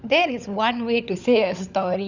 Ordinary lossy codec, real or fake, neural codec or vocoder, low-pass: none; fake; codec, 16 kHz, 16 kbps, FunCodec, trained on LibriTTS, 50 frames a second; 7.2 kHz